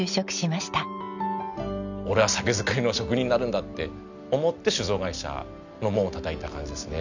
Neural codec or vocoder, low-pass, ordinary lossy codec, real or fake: none; 7.2 kHz; none; real